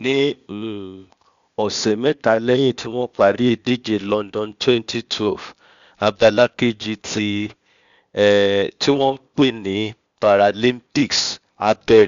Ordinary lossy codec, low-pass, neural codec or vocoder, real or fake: Opus, 64 kbps; 7.2 kHz; codec, 16 kHz, 0.8 kbps, ZipCodec; fake